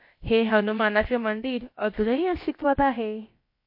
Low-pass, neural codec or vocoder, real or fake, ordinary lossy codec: 5.4 kHz; codec, 16 kHz, about 1 kbps, DyCAST, with the encoder's durations; fake; MP3, 32 kbps